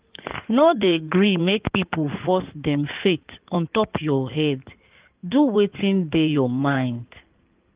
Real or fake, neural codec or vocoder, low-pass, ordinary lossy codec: fake; codec, 16 kHz in and 24 kHz out, 2.2 kbps, FireRedTTS-2 codec; 3.6 kHz; Opus, 32 kbps